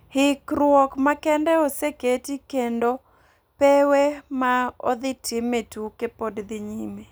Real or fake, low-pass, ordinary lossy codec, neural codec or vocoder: real; none; none; none